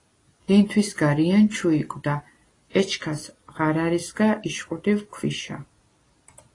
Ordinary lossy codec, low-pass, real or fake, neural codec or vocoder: AAC, 32 kbps; 10.8 kHz; real; none